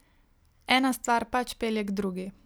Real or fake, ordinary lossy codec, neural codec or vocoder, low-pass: real; none; none; none